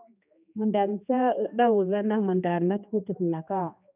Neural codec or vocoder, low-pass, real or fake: codec, 16 kHz, 2 kbps, X-Codec, HuBERT features, trained on general audio; 3.6 kHz; fake